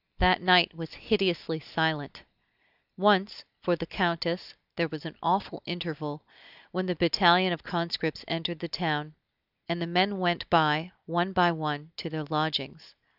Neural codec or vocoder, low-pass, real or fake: none; 5.4 kHz; real